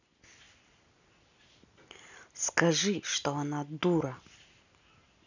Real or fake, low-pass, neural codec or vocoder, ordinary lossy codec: real; 7.2 kHz; none; none